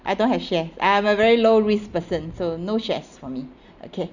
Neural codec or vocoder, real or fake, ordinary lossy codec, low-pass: none; real; Opus, 64 kbps; 7.2 kHz